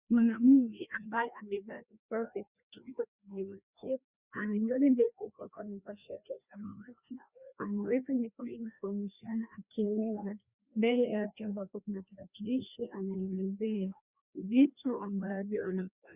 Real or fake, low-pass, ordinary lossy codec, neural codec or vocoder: fake; 3.6 kHz; Opus, 64 kbps; codec, 16 kHz, 1 kbps, FreqCodec, larger model